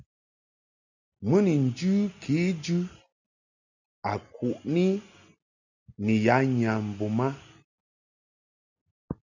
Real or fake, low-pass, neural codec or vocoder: real; 7.2 kHz; none